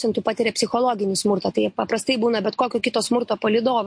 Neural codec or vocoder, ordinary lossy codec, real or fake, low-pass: none; MP3, 48 kbps; real; 9.9 kHz